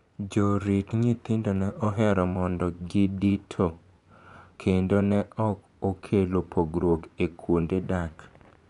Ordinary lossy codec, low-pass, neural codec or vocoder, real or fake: none; 10.8 kHz; vocoder, 24 kHz, 100 mel bands, Vocos; fake